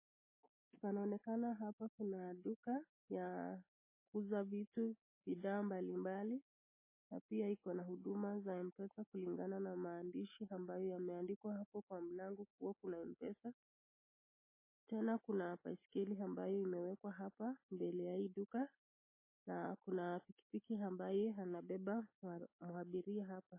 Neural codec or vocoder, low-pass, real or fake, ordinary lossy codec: none; 3.6 kHz; real; MP3, 16 kbps